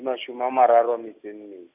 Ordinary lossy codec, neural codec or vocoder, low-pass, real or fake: none; none; 3.6 kHz; real